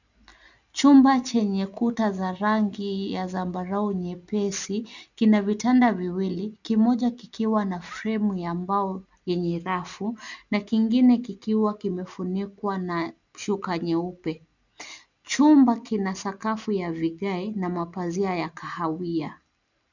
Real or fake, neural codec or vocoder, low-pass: real; none; 7.2 kHz